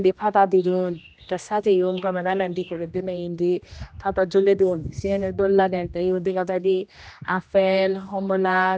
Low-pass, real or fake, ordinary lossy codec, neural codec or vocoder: none; fake; none; codec, 16 kHz, 1 kbps, X-Codec, HuBERT features, trained on general audio